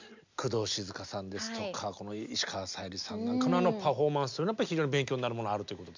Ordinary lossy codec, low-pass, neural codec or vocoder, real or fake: MP3, 64 kbps; 7.2 kHz; none; real